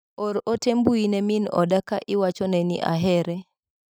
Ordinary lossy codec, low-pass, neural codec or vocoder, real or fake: none; none; none; real